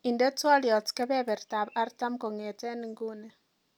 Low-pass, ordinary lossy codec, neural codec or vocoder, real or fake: none; none; none; real